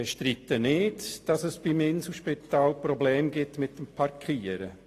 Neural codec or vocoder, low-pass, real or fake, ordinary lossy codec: none; 14.4 kHz; real; AAC, 48 kbps